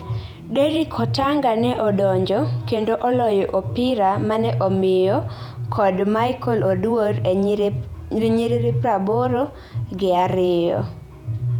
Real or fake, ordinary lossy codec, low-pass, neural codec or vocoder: fake; none; 19.8 kHz; vocoder, 48 kHz, 128 mel bands, Vocos